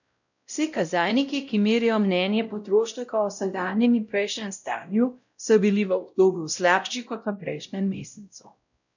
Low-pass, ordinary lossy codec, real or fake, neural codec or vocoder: 7.2 kHz; none; fake; codec, 16 kHz, 0.5 kbps, X-Codec, WavLM features, trained on Multilingual LibriSpeech